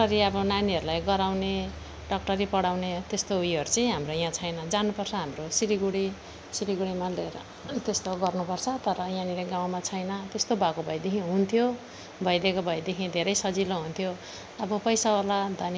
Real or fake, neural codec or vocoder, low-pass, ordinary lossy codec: real; none; none; none